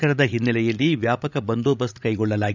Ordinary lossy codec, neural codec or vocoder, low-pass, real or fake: none; codec, 16 kHz, 16 kbps, FreqCodec, larger model; 7.2 kHz; fake